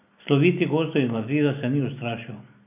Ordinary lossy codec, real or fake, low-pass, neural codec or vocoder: none; real; 3.6 kHz; none